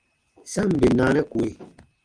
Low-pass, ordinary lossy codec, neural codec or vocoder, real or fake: 9.9 kHz; Opus, 32 kbps; none; real